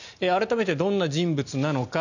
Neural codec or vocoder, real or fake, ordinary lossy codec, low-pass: none; real; none; 7.2 kHz